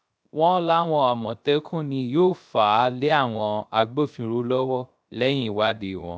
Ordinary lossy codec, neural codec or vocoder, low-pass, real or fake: none; codec, 16 kHz, 0.3 kbps, FocalCodec; none; fake